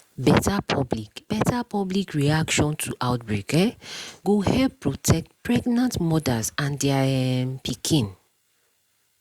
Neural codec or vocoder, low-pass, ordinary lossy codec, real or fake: none; 19.8 kHz; Opus, 64 kbps; real